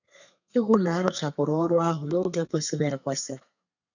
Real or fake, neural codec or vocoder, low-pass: fake; codec, 32 kHz, 1.9 kbps, SNAC; 7.2 kHz